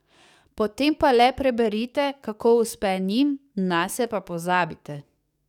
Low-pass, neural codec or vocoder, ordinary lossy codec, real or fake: 19.8 kHz; codec, 44.1 kHz, 7.8 kbps, DAC; none; fake